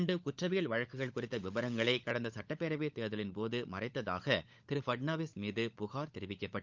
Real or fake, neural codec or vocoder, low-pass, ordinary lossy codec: fake; codec, 16 kHz, 16 kbps, FunCodec, trained on LibriTTS, 50 frames a second; 7.2 kHz; Opus, 24 kbps